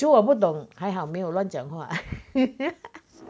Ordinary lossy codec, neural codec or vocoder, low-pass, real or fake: none; none; none; real